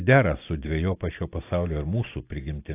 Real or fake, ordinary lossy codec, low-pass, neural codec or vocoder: real; AAC, 24 kbps; 3.6 kHz; none